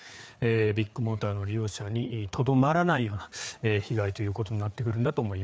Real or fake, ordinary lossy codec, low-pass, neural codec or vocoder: fake; none; none; codec, 16 kHz, 4 kbps, FreqCodec, larger model